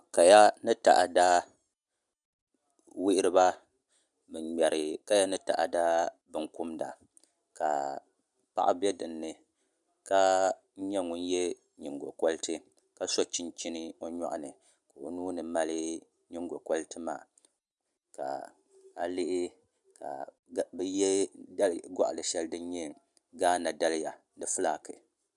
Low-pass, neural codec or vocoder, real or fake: 10.8 kHz; none; real